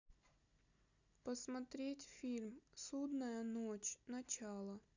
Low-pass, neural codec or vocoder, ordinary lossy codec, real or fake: 7.2 kHz; none; none; real